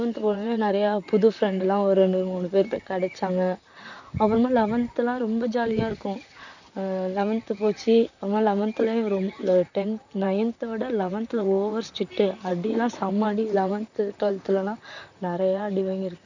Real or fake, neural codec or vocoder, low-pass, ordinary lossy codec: fake; vocoder, 44.1 kHz, 128 mel bands, Pupu-Vocoder; 7.2 kHz; none